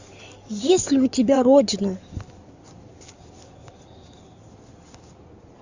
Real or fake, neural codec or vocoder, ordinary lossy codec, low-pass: fake; codec, 16 kHz in and 24 kHz out, 2.2 kbps, FireRedTTS-2 codec; Opus, 64 kbps; 7.2 kHz